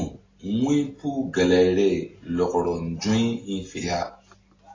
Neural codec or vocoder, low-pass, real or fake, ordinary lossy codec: none; 7.2 kHz; real; AAC, 32 kbps